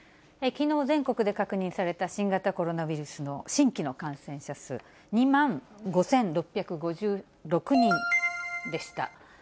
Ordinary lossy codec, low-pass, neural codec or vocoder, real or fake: none; none; none; real